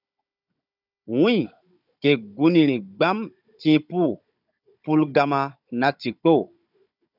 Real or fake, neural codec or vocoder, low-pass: fake; codec, 16 kHz, 16 kbps, FunCodec, trained on Chinese and English, 50 frames a second; 5.4 kHz